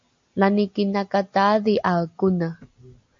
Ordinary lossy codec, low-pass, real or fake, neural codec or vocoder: MP3, 48 kbps; 7.2 kHz; real; none